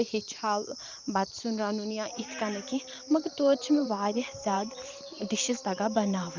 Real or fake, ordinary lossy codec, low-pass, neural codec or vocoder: fake; Opus, 24 kbps; 7.2 kHz; vocoder, 44.1 kHz, 128 mel bands every 512 samples, BigVGAN v2